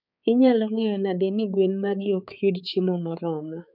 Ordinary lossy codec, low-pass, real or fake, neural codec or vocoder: none; 5.4 kHz; fake; codec, 16 kHz, 4 kbps, X-Codec, HuBERT features, trained on balanced general audio